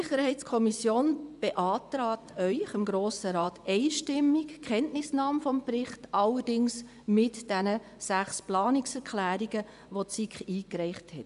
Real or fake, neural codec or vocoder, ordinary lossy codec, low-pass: fake; vocoder, 24 kHz, 100 mel bands, Vocos; none; 10.8 kHz